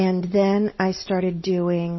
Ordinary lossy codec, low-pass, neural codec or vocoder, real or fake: MP3, 24 kbps; 7.2 kHz; none; real